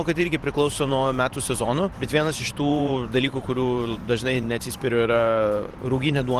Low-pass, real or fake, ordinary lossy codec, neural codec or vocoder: 14.4 kHz; fake; Opus, 24 kbps; vocoder, 44.1 kHz, 128 mel bands every 512 samples, BigVGAN v2